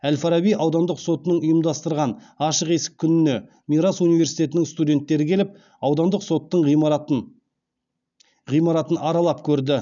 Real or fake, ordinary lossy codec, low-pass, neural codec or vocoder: real; none; 7.2 kHz; none